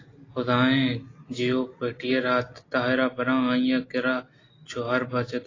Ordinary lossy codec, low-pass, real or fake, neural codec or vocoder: AAC, 32 kbps; 7.2 kHz; real; none